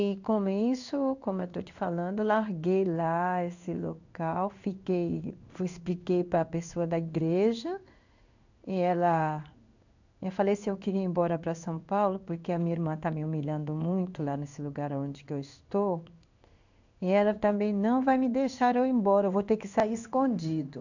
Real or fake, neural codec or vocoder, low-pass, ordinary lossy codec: fake; codec, 16 kHz in and 24 kHz out, 1 kbps, XY-Tokenizer; 7.2 kHz; none